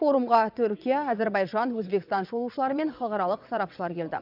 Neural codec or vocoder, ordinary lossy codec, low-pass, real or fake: vocoder, 22.05 kHz, 80 mel bands, WaveNeXt; none; 5.4 kHz; fake